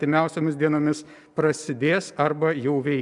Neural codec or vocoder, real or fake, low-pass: none; real; 10.8 kHz